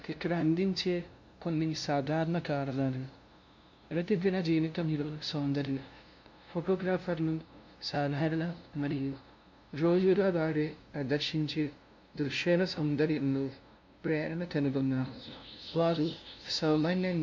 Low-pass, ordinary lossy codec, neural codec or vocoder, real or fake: 7.2 kHz; MP3, 48 kbps; codec, 16 kHz, 0.5 kbps, FunCodec, trained on LibriTTS, 25 frames a second; fake